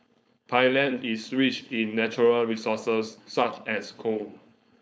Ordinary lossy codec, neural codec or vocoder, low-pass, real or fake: none; codec, 16 kHz, 4.8 kbps, FACodec; none; fake